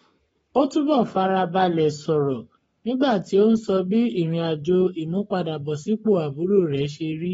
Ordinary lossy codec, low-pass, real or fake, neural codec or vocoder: AAC, 24 kbps; 19.8 kHz; fake; codec, 44.1 kHz, 7.8 kbps, Pupu-Codec